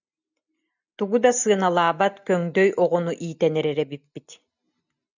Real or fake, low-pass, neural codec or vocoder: real; 7.2 kHz; none